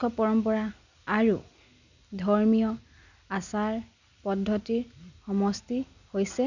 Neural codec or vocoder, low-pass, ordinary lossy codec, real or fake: none; 7.2 kHz; none; real